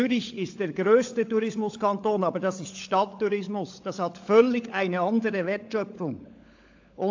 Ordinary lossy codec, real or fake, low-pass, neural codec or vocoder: AAC, 48 kbps; fake; 7.2 kHz; codec, 16 kHz, 16 kbps, FunCodec, trained on LibriTTS, 50 frames a second